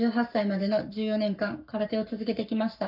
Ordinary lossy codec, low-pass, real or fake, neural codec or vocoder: none; 5.4 kHz; fake; codec, 44.1 kHz, 7.8 kbps, Pupu-Codec